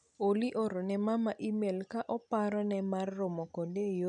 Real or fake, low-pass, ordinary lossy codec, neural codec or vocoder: real; 9.9 kHz; none; none